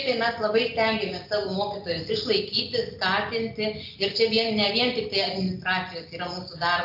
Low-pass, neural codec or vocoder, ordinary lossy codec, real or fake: 5.4 kHz; none; AAC, 48 kbps; real